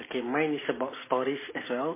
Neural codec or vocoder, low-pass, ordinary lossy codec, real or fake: none; 3.6 kHz; MP3, 16 kbps; real